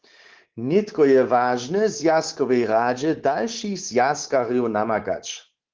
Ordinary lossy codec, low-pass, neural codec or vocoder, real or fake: Opus, 16 kbps; 7.2 kHz; codec, 24 kHz, 3.1 kbps, DualCodec; fake